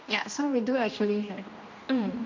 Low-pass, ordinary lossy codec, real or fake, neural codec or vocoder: 7.2 kHz; MP3, 48 kbps; fake; codec, 16 kHz, 1 kbps, X-Codec, HuBERT features, trained on general audio